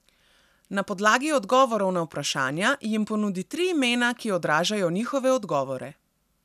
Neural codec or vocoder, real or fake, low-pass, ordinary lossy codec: none; real; 14.4 kHz; none